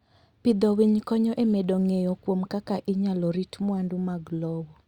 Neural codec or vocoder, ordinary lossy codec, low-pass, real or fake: none; Opus, 64 kbps; 19.8 kHz; real